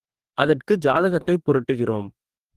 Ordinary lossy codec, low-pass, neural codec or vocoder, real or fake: Opus, 24 kbps; 14.4 kHz; codec, 44.1 kHz, 2.6 kbps, DAC; fake